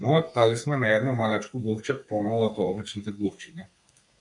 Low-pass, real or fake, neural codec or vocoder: 10.8 kHz; fake; codec, 32 kHz, 1.9 kbps, SNAC